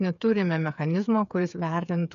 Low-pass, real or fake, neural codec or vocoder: 7.2 kHz; fake; codec, 16 kHz, 8 kbps, FreqCodec, smaller model